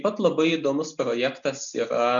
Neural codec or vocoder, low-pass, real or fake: none; 7.2 kHz; real